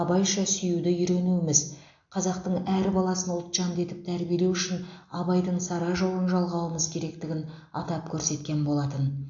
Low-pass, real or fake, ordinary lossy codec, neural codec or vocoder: 7.2 kHz; real; AAC, 48 kbps; none